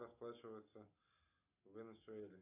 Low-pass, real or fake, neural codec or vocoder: 3.6 kHz; real; none